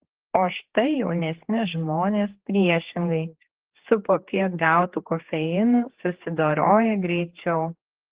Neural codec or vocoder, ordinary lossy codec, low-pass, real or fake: codec, 16 kHz, 4 kbps, X-Codec, HuBERT features, trained on general audio; Opus, 16 kbps; 3.6 kHz; fake